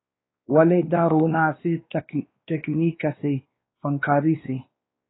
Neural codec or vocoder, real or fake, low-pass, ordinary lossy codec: codec, 16 kHz, 2 kbps, X-Codec, WavLM features, trained on Multilingual LibriSpeech; fake; 7.2 kHz; AAC, 16 kbps